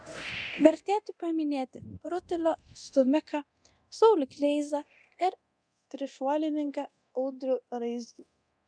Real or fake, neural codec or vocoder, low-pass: fake; codec, 24 kHz, 0.9 kbps, DualCodec; 9.9 kHz